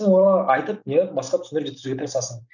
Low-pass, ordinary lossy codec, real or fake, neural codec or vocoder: 7.2 kHz; none; real; none